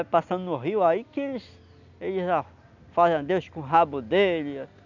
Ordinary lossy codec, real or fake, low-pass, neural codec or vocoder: none; real; 7.2 kHz; none